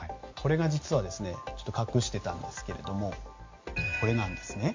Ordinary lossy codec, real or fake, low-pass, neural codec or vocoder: MP3, 48 kbps; real; 7.2 kHz; none